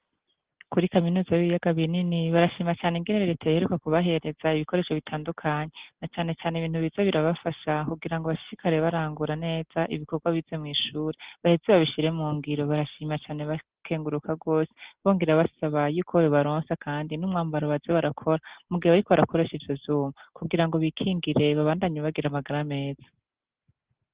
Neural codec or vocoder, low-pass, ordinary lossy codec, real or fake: none; 3.6 kHz; Opus, 16 kbps; real